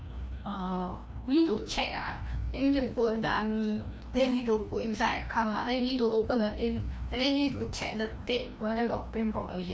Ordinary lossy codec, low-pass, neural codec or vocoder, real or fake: none; none; codec, 16 kHz, 1 kbps, FreqCodec, larger model; fake